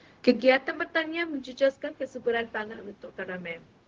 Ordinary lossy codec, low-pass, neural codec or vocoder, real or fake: Opus, 16 kbps; 7.2 kHz; codec, 16 kHz, 0.4 kbps, LongCat-Audio-Codec; fake